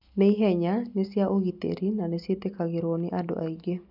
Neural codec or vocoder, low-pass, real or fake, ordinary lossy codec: none; 5.4 kHz; real; none